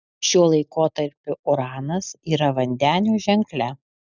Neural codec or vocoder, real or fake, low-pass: none; real; 7.2 kHz